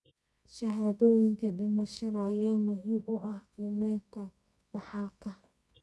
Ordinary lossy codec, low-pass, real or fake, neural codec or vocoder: none; none; fake; codec, 24 kHz, 0.9 kbps, WavTokenizer, medium music audio release